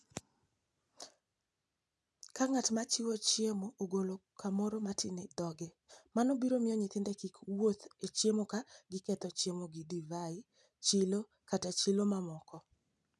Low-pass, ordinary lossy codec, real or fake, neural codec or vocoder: none; none; real; none